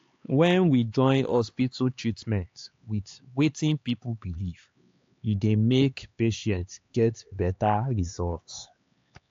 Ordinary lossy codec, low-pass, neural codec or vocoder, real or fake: AAC, 48 kbps; 7.2 kHz; codec, 16 kHz, 4 kbps, X-Codec, HuBERT features, trained on LibriSpeech; fake